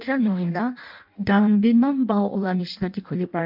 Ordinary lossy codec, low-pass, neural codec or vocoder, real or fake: MP3, 48 kbps; 5.4 kHz; codec, 16 kHz in and 24 kHz out, 0.6 kbps, FireRedTTS-2 codec; fake